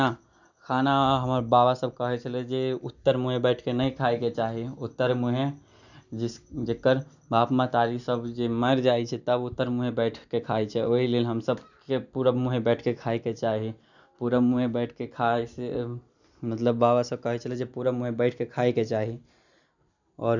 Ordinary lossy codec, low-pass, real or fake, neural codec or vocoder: none; 7.2 kHz; real; none